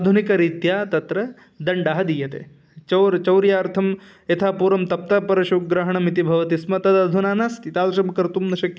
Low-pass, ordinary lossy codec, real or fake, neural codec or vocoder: none; none; real; none